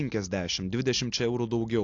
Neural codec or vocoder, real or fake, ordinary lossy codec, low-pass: none; real; AAC, 48 kbps; 7.2 kHz